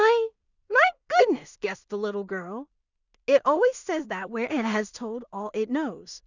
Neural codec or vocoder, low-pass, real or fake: codec, 16 kHz in and 24 kHz out, 0.4 kbps, LongCat-Audio-Codec, two codebook decoder; 7.2 kHz; fake